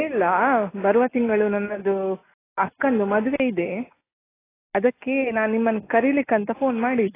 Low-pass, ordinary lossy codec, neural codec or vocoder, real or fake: 3.6 kHz; AAC, 16 kbps; none; real